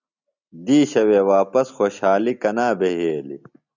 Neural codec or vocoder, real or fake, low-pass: none; real; 7.2 kHz